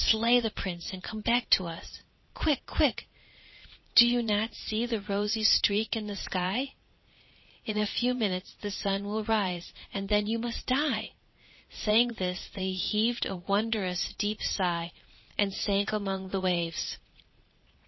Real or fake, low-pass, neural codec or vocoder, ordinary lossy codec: fake; 7.2 kHz; vocoder, 22.05 kHz, 80 mel bands, Vocos; MP3, 24 kbps